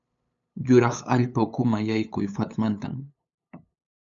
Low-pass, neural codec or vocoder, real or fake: 7.2 kHz; codec, 16 kHz, 8 kbps, FunCodec, trained on LibriTTS, 25 frames a second; fake